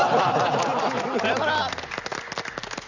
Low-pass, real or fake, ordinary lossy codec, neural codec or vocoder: 7.2 kHz; real; none; none